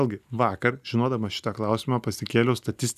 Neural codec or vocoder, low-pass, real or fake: none; 14.4 kHz; real